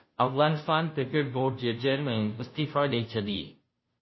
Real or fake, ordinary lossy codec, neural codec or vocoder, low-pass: fake; MP3, 24 kbps; codec, 16 kHz, 0.5 kbps, FunCodec, trained on Chinese and English, 25 frames a second; 7.2 kHz